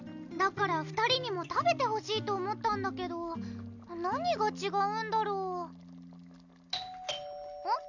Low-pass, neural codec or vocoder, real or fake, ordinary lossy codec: 7.2 kHz; none; real; none